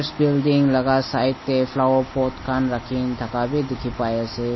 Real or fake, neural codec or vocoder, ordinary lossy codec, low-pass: real; none; MP3, 24 kbps; 7.2 kHz